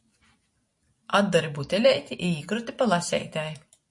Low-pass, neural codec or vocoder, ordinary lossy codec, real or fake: 10.8 kHz; none; MP3, 48 kbps; real